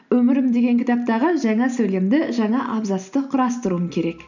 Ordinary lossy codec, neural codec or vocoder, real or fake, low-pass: none; none; real; 7.2 kHz